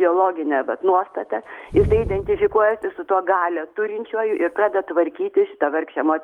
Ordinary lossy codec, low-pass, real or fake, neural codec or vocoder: Opus, 32 kbps; 19.8 kHz; real; none